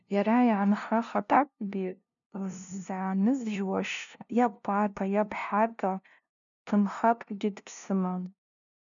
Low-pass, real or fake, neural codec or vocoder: 7.2 kHz; fake; codec, 16 kHz, 0.5 kbps, FunCodec, trained on LibriTTS, 25 frames a second